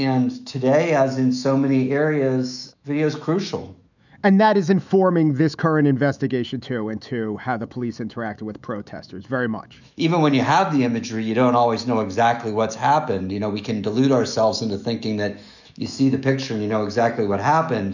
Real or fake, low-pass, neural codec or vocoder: fake; 7.2 kHz; autoencoder, 48 kHz, 128 numbers a frame, DAC-VAE, trained on Japanese speech